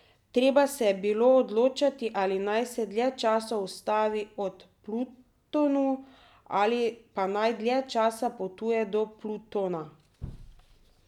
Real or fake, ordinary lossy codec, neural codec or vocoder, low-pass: real; none; none; 19.8 kHz